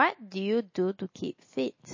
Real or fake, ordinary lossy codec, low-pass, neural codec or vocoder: real; MP3, 32 kbps; 7.2 kHz; none